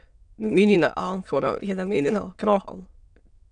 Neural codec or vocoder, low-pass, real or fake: autoencoder, 22.05 kHz, a latent of 192 numbers a frame, VITS, trained on many speakers; 9.9 kHz; fake